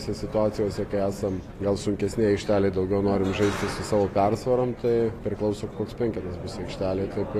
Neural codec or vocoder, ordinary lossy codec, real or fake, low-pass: none; AAC, 48 kbps; real; 14.4 kHz